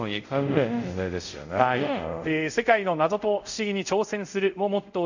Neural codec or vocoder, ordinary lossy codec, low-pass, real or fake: codec, 24 kHz, 0.5 kbps, DualCodec; none; 7.2 kHz; fake